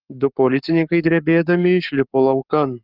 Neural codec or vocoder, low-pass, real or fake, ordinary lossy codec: codec, 44.1 kHz, 7.8 kbps, Pupu-Codec; 5.4 kHz; fake; Opus, 24 kbps